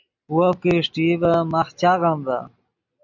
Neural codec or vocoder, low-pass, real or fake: none; 7.2 kHz; real